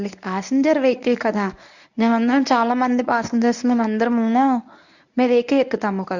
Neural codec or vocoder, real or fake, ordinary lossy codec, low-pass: codec, 24 kHz, 0.9 kbps, WavTokenizer, medium speech release version 1; fake; none; 7.2 kHz